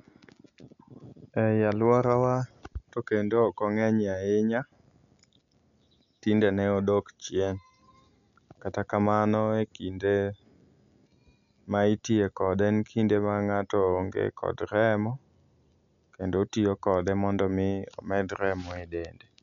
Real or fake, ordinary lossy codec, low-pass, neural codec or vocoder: real; none; 7.2 kHz; none